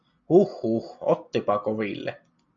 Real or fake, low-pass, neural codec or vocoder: real; 7.2 kHz; none